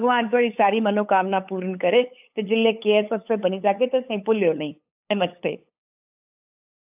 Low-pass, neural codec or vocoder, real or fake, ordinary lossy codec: 3.6 kHz; codec, 16 kHz, 8 kbps, FunCodec, trained on LibriTTS, 25 frames a second; fake; AAC, 32 kbps